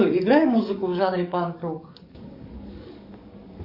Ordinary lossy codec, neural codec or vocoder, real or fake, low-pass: AAC, 48 kbps; codec, 44.1 kHz, 7.8 kbps, DAC; fake; 5.4 kHz